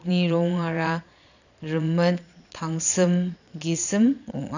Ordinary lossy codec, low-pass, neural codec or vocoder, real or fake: none; 7.2 kHz; vocoder, 22.05 kHz, 80 mel bands, Vocos; fake